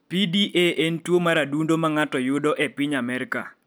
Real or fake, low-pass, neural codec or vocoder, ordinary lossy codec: real; none; none; none